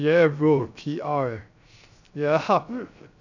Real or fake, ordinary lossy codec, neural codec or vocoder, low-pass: fake; none; codec, 16 kHz, 0.3 kbps, FocalCodec; 7.2 kHz